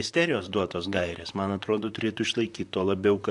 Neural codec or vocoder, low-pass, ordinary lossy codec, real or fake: vocoder, 44.1 kHz, 128 mel bands, Pupu-Vocoder; 10.8 kHz; AAC, 64 kbps; fake